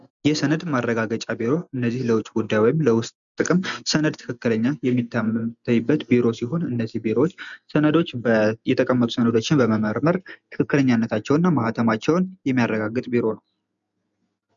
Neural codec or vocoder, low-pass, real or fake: none; 7.2 kHz; real